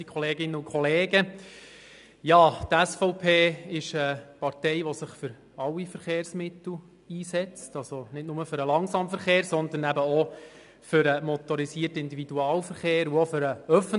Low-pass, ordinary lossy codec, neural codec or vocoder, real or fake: 10.8 kHz; AAC, 96 kbps; none; real